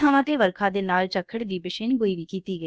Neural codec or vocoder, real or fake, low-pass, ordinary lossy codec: codec, 16 kHz, about 1 kbps, DyCAST, with the encoder's durations; fake; none; none